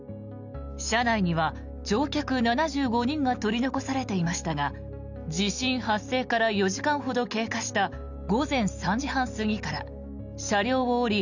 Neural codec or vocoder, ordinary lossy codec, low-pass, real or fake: none; none; 7.2 kHz; real